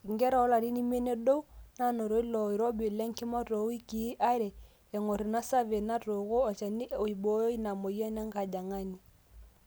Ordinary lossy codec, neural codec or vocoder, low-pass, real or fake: none; none; none; real